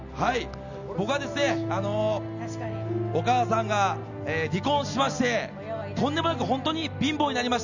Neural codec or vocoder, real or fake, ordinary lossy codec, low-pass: none; real; none; 7.2 kHz